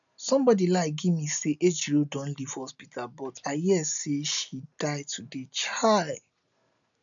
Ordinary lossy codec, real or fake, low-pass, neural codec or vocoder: none; real; 7.2 kHz; none